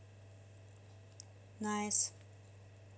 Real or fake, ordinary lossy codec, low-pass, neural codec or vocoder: real; none; none; none